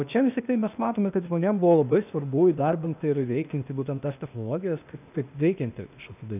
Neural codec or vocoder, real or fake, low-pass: codec, 16 kHz, 0.8 kbps, ZipCodec; fake; 3.6 kHz